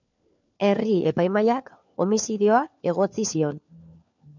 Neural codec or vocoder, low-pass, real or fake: codec, 16 kHz, 4 kbps, FunCodec, trained on LibriTTS, 50 frames a second; 7.2 kHz; fake